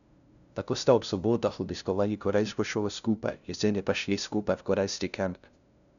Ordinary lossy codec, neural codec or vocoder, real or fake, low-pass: none; codec, 16 kHz, 0.5 kbps, FunCodec, trained on LibriTTS, 25 frames a second; fake; 7.2 kHz